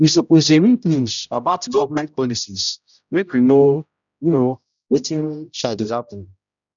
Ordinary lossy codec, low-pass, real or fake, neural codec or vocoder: none; 7.2 kHz; fake; codec, 16 kHz, 0.5 kbps, X-Codec, HuBERT features, trained on general audio